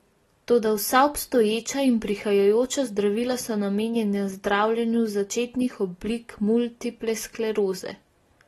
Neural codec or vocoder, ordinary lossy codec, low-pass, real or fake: none; AAC, 32 kbps; 19.8 kHz; real